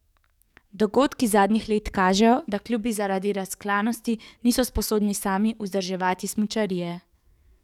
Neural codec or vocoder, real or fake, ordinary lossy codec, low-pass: codec, 44.1 kHz, 7.8 kbps, DAC; fake; none; 19.8 kHz